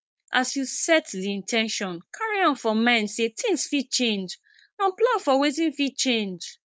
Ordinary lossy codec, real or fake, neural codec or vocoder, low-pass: none; fake; codec, 16 kHz, 4.8 kbps, FACodec; none